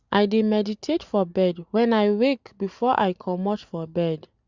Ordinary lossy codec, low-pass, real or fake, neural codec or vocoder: Opus, 64 kbps; 7.2 kHz; real; none